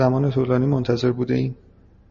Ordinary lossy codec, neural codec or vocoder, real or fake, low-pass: MP3, 32 kbps; none; real; 7.2 kHz